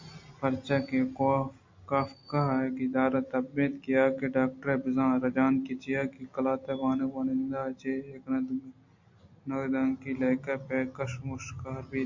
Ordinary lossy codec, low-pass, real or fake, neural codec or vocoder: MP3, 48 kbps; 7.2 kHz; real; none